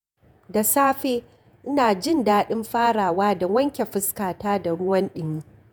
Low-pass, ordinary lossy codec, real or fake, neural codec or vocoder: none; none; fake; vocoder, 48 kHz, 128 mel bands, Vocos